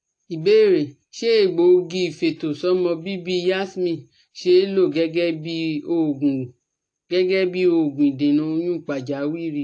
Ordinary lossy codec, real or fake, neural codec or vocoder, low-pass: AAC, 48 kbps; real; none; 9.9 kHz